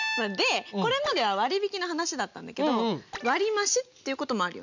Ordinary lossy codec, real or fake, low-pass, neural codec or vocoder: none; real; 7.2 kHz; none